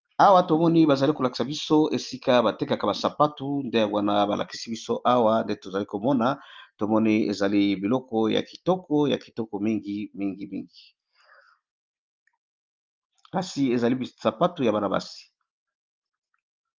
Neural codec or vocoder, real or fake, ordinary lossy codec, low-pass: none; real; Opus, 24 kbps; 7.2 kHz